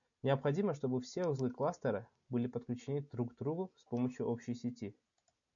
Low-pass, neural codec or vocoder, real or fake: 7.2 kHz; none; real